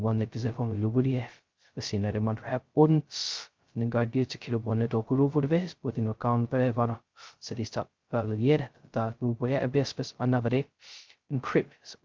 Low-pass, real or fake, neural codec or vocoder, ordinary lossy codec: 7.2 kHz; fake; codec, 16 kHz, 0.2 kbps, FocalCodec; Opus, 32 kbps